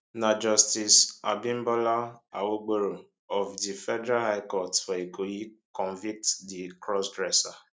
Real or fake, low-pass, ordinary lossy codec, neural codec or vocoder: real; none; none; none